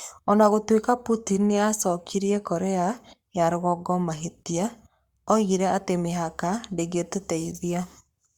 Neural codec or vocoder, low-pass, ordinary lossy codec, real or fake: codec, 44.1 kHz, 7.8 kbps, Pupu-Codec; 19.8 kHz; Opus, 64 kbps; fake